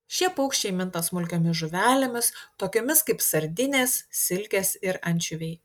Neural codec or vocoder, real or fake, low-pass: none; real; 19.8 kHz